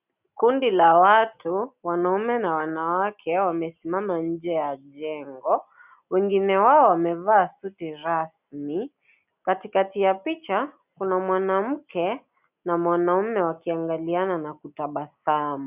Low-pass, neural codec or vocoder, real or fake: 3.6 kHz; none; real